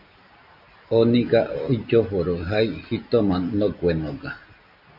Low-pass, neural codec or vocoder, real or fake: 5.4 kHz; none; real